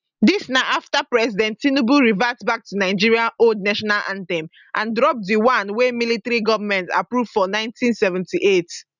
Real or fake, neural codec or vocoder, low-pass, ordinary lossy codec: real; none; 7.2 kHz; none